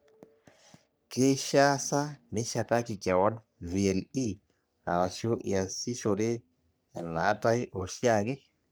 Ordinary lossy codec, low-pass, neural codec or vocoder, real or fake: none; none; codec, 44.1 kHz, 3.4 kbps, Pupu-Codec; fake